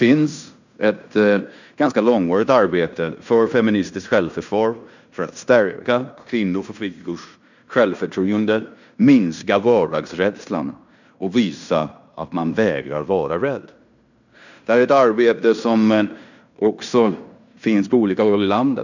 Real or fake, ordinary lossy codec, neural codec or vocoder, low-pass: fake; none; codec, 16 kHz in and 24 kHz out, 0.9 kbps, LongCat-Audio-Codec, fine tuned four codebook decoder; 7.2 kHz